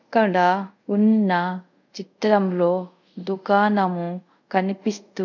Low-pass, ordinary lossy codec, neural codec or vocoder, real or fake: 7.2 kHz; none; codec, 24 kHz, 0.5 kbps, DualCodec; fake